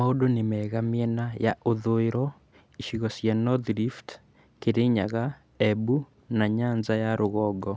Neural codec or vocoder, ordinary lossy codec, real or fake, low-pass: none; none; real; none